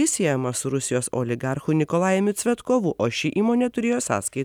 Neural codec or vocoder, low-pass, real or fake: none; 19.8 kHz; real